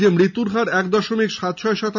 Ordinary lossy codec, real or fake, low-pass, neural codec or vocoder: none; real; 7.2 kHz; none